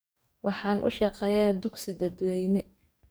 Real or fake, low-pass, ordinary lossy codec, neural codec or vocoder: fake; none; none; codec, 44.1 kHz, 2.6 kbps, DAC